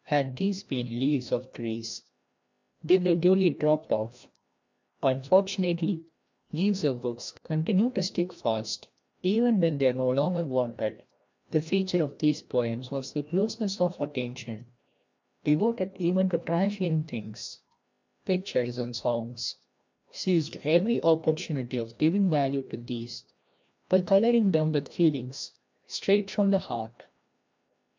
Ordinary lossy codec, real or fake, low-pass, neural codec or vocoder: MP3, 64 kbps; fake; 7.2 kHz; codec, 16 kHz, 1 kbps, FreqCodec, larger model